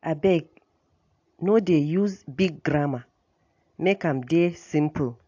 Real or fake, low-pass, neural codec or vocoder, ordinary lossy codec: real; 7.2 kHz; none; AAC, 48 kbps